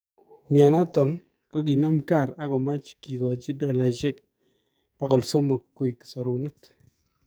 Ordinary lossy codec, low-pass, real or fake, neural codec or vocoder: none; none; fake; codec, 44.1 kHz, 2.6 kbps, SNAC